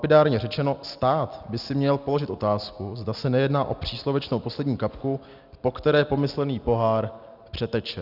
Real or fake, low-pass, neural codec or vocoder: real; 5.4 kHz; none